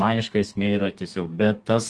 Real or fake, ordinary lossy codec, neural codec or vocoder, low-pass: fake; Opus, 24 kbps; codec, 32 kHz, 1.9 kbps, SNAC; 10.8 kHz